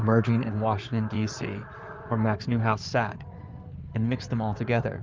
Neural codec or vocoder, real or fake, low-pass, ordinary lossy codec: codec, 16 kHz in and 24 kHz out, 2.2 kbps, FireRedTTS-2 codec; fake; 7.2 kHz; Opus, 24 kbps